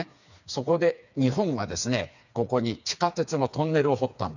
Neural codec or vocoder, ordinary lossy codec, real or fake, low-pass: codec, 16 kHz, 4 kbps, FreqCodec, smaller model; none; fake; 7.2 kHz